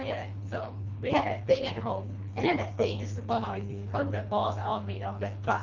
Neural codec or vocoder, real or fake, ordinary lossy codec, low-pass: codec, 24 kHz, 1.5 kbps, HILCodec; fake; Opus, 24 kbps; 7.2 kHz